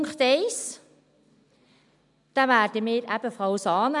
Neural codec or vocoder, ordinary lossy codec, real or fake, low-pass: none; none; real; 10.8 kHz